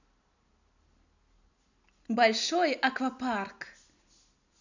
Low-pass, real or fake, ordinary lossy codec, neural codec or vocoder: 7.2 kHz; real; none; none